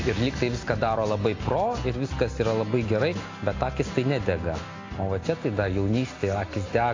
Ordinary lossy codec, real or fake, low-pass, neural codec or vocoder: AAC, 32 kbps; real; 7.2 kHz; none